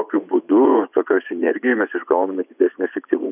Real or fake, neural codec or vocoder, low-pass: fake; vocoder, 24 kHz, 100 mel bands, Vocos; 3.6 kHz